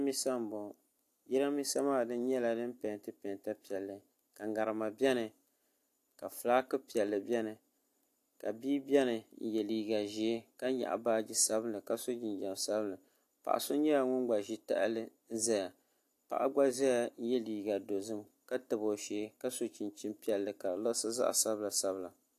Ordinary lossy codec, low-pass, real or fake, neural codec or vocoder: AAC, 64 kbps; 14.4 kHz; real; none